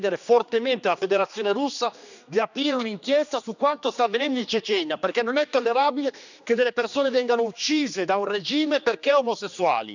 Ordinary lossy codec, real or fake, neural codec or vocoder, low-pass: none; fake; codec, 16 kHz, 2 kbps, X-Codec, HuBERT features, trained on general audio; 7.2 kHz